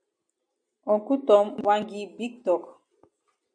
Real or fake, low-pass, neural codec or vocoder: real; 9.9 kHz; none